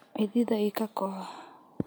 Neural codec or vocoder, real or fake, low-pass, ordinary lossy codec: none; real; none; none